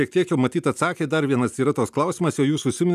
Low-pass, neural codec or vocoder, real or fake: 14.4 kHz; none; real